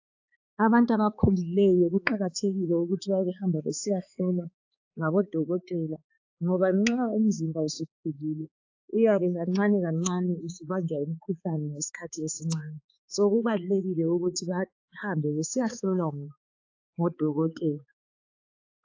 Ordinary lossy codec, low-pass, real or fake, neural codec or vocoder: AAC, 48 kbps; 7.2 kHz; fake; codec, 16 kHz, 4 kbps, X-Codec, HuBERT features, trained on balanced general audio